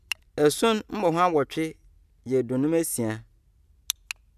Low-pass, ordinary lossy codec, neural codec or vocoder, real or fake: 14.4 kHz; none; none; real